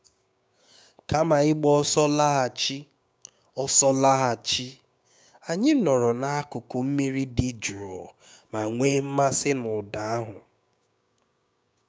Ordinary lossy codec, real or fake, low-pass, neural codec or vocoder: none; fake; none; codec, 16 kHz, 6 kbps, DAC